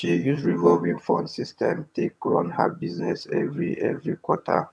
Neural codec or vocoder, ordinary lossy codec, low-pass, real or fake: vocoder, 22.05 kHz, 80 mel bands, HiFi-GAN; none; none; fake